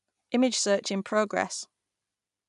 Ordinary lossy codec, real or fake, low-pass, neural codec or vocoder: none; real; 10.8 kHz; none